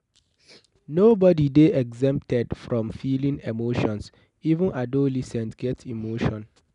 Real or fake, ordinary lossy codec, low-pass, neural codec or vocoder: real; none; 10.8 kHz; none